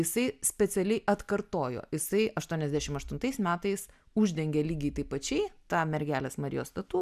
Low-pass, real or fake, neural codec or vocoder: 14.4 kHz; real; none